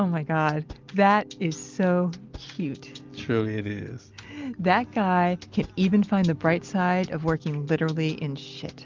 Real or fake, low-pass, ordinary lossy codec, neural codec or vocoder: real; 7.2 kHz; Opus, 32 kbps; none